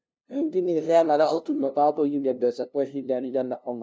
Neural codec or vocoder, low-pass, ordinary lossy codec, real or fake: codec, 16 kHz, 0.5 kbps, FunCodec, trained on LibriTTS, 25 frames a second; none; none; fake